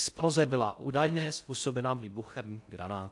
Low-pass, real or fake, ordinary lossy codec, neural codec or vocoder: 10.8 kHz; fake; AAC, 64 kbps; codec, 16 kHz in and 24 kHz out, 0.6 kbps, FocalCodec, streaming, 4096 codes